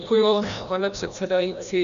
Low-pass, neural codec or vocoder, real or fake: 7.2 kHz; codec, 16 kHz, 1 kbps, FreqCodec, larger model; fake